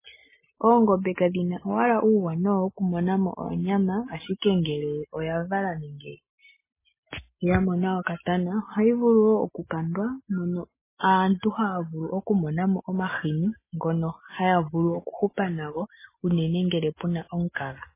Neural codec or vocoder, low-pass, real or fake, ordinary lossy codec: none; 3.6 kHz; real; MP3, 16 kbps